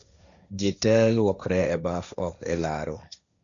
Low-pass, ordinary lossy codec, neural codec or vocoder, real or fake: 7.2 kHz; none; codec, 16 kHz, 1.1 kbps, Voila-Tokenizer; fake